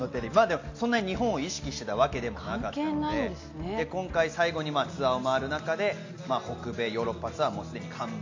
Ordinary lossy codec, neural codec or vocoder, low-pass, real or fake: AAC, 48 kbps; none; 7.2 kHz; real